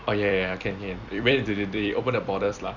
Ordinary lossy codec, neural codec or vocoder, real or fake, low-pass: none; vocoder, 44.1 kHz, 128 mel bands every 256 samples, BigVGAN v2; fake; 7.2 kHz